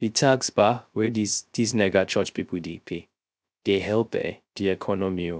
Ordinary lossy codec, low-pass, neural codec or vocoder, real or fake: none; none; codec, 16 kHz, 0.3 kbps, FocalCodec; fake